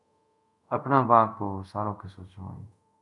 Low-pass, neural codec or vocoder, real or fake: 10.8 kHz; codec, 24 kHz, 0.5 kbps, DualCodec; fake